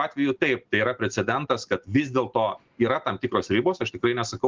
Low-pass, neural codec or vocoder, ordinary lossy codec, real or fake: 7.2 kHz; none; Opus, 16 kbps; real